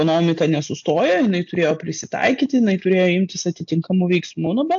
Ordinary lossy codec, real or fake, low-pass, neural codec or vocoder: AAC, 64 kbps; real; 7.2 kHz; none